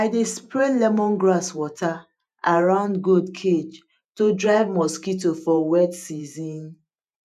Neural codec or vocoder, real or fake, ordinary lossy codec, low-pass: vocoder, 48 kHz, 128 mel bands, Vocos; fake; none; 14.4 kHz